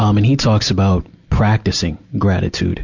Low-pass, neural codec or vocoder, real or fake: 7.2 kHz; none; real